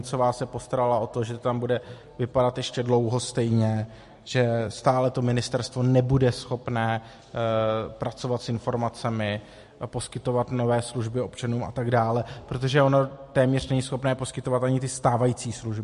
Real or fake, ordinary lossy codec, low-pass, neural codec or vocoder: real; MP3, 48 kbps; 14.4 kHz; none